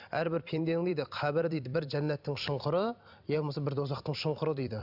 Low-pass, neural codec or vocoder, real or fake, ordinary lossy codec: 5.4 kHz; vocoder, 44.1 kHz, 128 mel bands every 256 samples, BigVGAN v2; fake; none